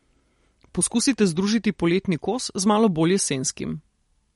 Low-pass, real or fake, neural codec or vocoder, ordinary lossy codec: 19.8 kHz; fake; vocoder, 44.1 kHz, 128 mel bands every 256 samples, BigVGAN v2; MP3, 48 kbps